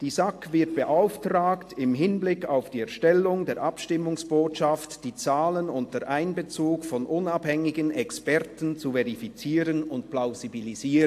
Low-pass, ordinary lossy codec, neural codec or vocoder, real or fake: 14.4 kHz; AAC, 64 kbps; none; real